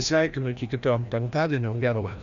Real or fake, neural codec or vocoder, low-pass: fake; codec, 16 kHz, 1 kbps, FreqCodec, larger model; 7.2 kHz